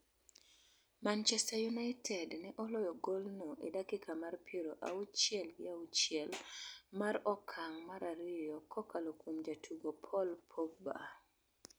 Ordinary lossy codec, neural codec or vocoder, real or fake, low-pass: none; none; real; none